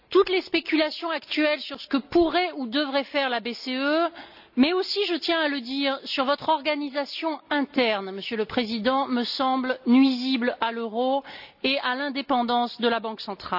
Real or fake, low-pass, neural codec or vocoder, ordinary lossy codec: real; 5.4 kHz; none; none